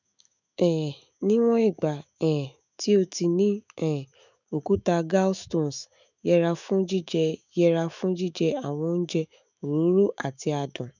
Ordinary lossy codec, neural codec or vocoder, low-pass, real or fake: none; autoencoder, 48 kHz, 128 numbers a frame, DAC-VAE, trained on Japanese speech; 7.2 kHz; fake